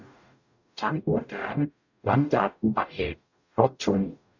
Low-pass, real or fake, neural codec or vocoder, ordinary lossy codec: 7.2 kHz; fake; codec, 44.1 kHz, 0.9 kbps, DAC; none